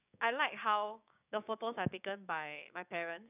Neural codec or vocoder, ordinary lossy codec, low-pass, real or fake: codec, 16 kHz, 6 kbps, DAC; none; 3.6 kHz; fake